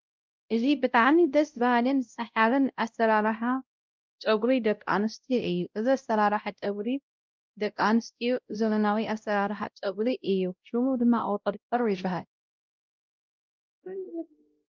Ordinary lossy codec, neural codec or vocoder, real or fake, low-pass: Opus, 24 kbps; codec, 16 kHz, 0.5 kbps, X-Codec, WavLM features, trained on Multilingual LibriSpeech; fake; 7.2 kHz